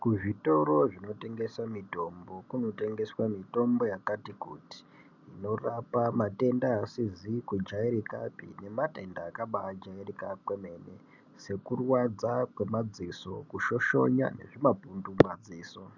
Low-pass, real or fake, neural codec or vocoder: 7.2 kHz; real; none